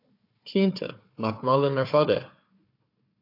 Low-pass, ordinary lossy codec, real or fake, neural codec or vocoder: 5.4 kHz; AAC, 32 kbps; fake; codec, 16 kHz, 4 kbps, FunCodec, trained on Chinese and English, 50 frames a second